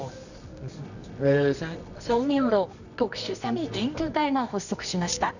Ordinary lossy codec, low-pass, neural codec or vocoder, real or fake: none; 7.2 kHz; codec, 24 kHz, 0.9 kbps, WavTokenizer, medium music audio release; fake